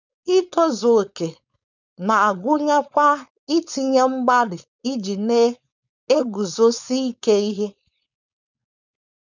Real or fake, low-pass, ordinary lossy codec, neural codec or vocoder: fake; 7.2 kHz; none; codec, 16 kHz, 4.8 kbps, FACodec